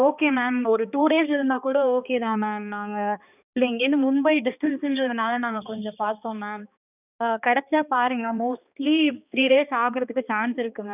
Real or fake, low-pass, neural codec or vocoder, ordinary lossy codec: fake; 3.6 kHz; codec, 16 kHz, 2 kbps, X-Codec, HuBERT features, trained on balanced general audio; none